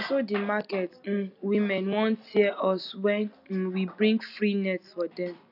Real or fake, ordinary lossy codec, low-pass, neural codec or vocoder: real; none; 5.4 kHz; none